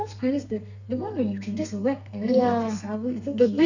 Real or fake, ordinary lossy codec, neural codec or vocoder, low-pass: fake; none; codec, 32 kHz, 1.9 kbps, SNAC; 7.2 kHz